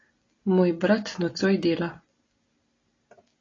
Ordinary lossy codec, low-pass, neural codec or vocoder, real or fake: AAC, 32 kbps; 7.2 kHz; none; real